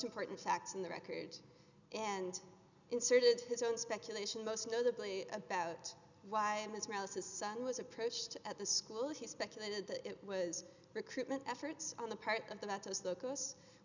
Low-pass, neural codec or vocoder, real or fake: 7.2 kHz; none; real